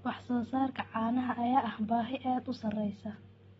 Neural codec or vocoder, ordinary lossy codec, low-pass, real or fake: none; AAC, 24 kbps; 14.4 kHz; real